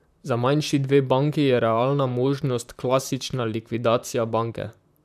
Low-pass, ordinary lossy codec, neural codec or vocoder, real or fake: 14.4 kHz; none; vocoder, 44.1 kHz, 128 mel bands, Pupu-Vocoder; fake